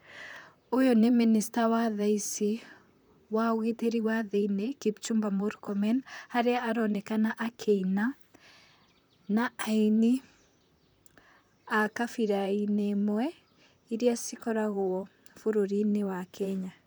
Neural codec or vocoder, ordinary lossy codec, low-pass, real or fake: vocoder, 44.1 kHz, 128 mel bands, Pupu-Vocoder; none; none; fake